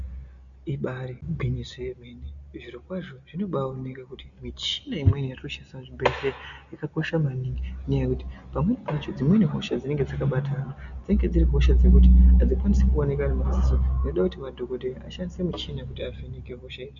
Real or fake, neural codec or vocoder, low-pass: real; none; 7.2 kHz